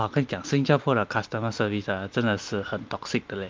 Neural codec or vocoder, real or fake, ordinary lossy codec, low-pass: none; real; Opus, 32 kbps; 7.2 kHz